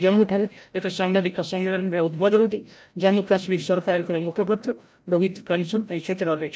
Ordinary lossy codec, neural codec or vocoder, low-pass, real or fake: none; codec, 16 kHz, 0.5 kbps, FreqCodec, larger model; none; fake